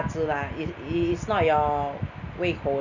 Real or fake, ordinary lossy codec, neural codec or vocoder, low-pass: real; none; none; 7.2 kHz